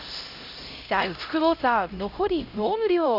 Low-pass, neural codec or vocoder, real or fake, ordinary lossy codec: 5.4 kHz; codec, 16 kHz, 0.5 kbps, X-Codec, HuBERT features, trained on LibriSpeech; fake; Opus, 64 kbps